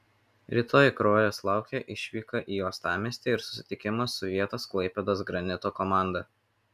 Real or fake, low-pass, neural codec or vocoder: real; 14.4 kHz; none